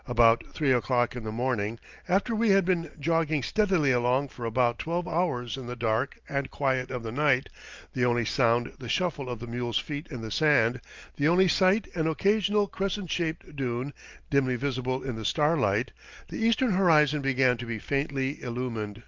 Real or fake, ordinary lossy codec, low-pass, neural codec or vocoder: real; Opus, 24 kbps; 7.2 kHz; none